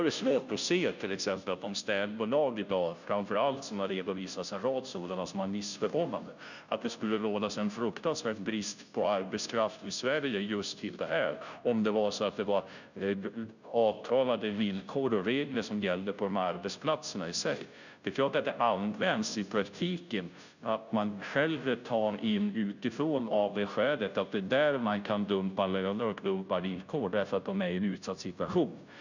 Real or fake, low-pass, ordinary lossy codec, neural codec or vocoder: fake; 7.2 kHz; none; codec, 16 kHz, 0.5 kbps, FunCodec, trained on Chinese and English, 25 frames a second